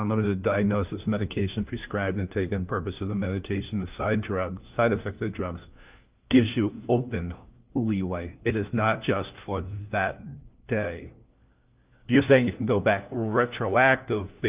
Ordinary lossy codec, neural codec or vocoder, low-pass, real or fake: Opus, 32 kbps; codec, 16 kHz, 1 kbps, FunCodec, trained on LibriTTS, 50 frames a second; 3.6 kHz; fake